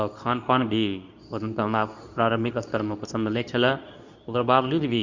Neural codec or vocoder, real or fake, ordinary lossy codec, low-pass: codec, 24 kHz, 0.9 kbps, WavTokenizer, medium speech release version 2; fake; Opus, 64 kbps; 7.2 kHz